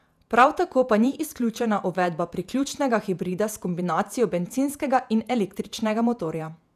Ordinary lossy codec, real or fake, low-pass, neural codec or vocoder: none; fake; 14.4 kHz; vocoder, 48 kHz, 128 mel bands, Vocos